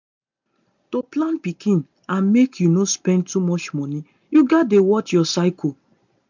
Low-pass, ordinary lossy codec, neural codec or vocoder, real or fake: 7.2 kHz; MP3, 64 kbps; none; real